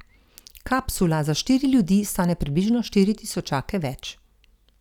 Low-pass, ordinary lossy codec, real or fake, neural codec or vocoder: 19.8 kHz; none; real; none